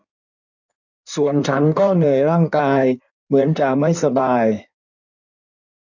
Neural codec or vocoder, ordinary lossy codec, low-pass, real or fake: codec, 16 kHz in and 24 kHz out, 1.1 kbps, FireRedTTS-2 codec; none; 7.2 kHz; fake